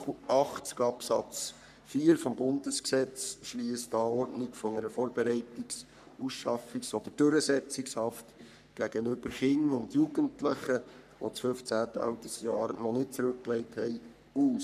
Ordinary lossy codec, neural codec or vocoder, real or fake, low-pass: none; codec, 44.1 kHz, 3.4 kbps, Pupu-Codec; fake; 14.4 kHz